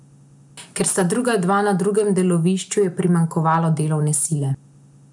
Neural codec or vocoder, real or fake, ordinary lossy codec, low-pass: none; real; none; 10.8 kHz